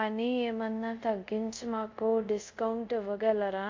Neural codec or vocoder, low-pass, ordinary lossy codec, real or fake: codec, 24 kHz, 0.5 kbps, DualCodec; 7.2 kHz; MP3, 48 kbps; fake